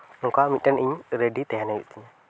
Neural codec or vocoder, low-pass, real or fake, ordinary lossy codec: none; none; real; none